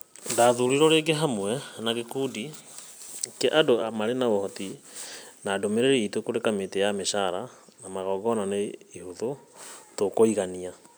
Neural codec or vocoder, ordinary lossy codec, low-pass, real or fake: none; none; none; real